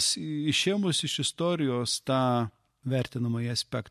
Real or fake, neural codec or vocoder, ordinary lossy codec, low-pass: real; none; MP3, 64 kbps; 14.4 kHz